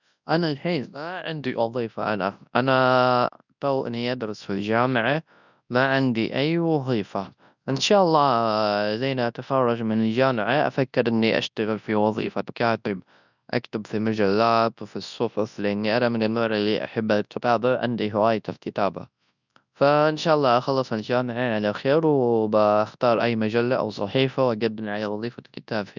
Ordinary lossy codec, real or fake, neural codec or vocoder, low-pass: none; fake; codec, 24 kHz, 0.9 kbps, WavTokenizer, large speech release; 7.2 kHz